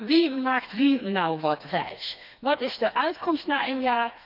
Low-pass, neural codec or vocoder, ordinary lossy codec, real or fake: 5.4 kHz; codec, 16 kHz, 2 kbps, FreqCodec, smaller model; none; fake